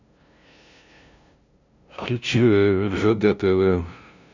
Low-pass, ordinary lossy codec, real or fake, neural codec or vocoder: 7.2 kHz; none; fake; codec, 16 kHz, 0.5 kbps, FunCodec, trained on LibriTTS, 25 frames a second